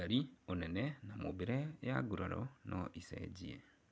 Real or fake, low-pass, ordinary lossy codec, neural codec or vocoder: real; none; none; none